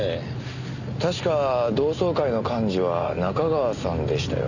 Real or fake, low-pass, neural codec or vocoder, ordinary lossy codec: real; 7.2 kHz; none; none